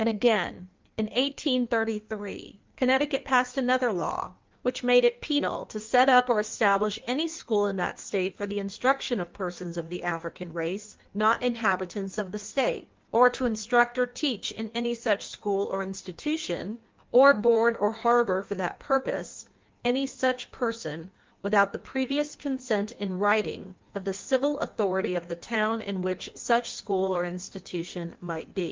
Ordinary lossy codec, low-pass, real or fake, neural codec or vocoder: Opus, 24 kbps; 7.2 kHz; fake; codec, 16 kHz in and 24 kHz out, 1.1 kbps, FireRedTTS-2 codec